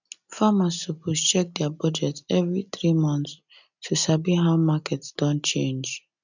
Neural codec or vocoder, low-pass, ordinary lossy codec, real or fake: none; 7.2 kHz; none; real